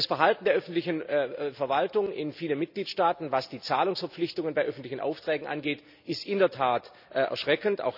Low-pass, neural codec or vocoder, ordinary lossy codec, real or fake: 5.4 kHz; none; none; real